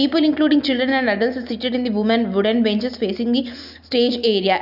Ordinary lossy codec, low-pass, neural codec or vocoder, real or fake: none; 5.4 kHz; none; real